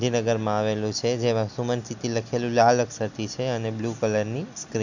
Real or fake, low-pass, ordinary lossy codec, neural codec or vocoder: real; 7.2 kHz; none; none